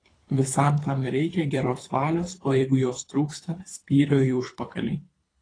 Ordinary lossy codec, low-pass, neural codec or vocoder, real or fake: AAC, 32 kbps; 9.9 kHz; codec, 24 kHz, 3 kbps, HILCodec; fake